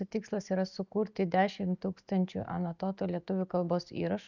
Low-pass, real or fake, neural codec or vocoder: 7.2 kHz; real; none